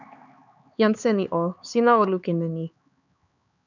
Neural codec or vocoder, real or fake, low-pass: codec, 16 kHz, 4 kbps, X-Codec, HuBERT features, trained on LibriSpeech; fake; 7.2 kHz